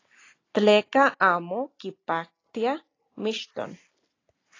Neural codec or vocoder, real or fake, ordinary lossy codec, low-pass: none; real; AAC, 32 kbps; 7.2 kHz